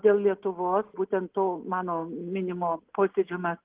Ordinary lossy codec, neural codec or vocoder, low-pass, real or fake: Opus, 16 kbps; none; 3.6 kHz; real